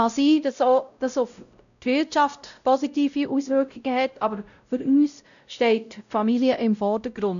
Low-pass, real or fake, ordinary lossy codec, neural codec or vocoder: 7.2 kHz; fake; none; codec, 16 kHz, 0.5 kbps, X-Codec, WavLM features, trained on Multilingual LibriSpeech